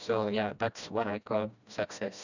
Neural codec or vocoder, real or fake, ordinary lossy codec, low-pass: codec, 16 kHz, 1 kbps, FreqCodec, smaller model; fake; none; 7.2 kHz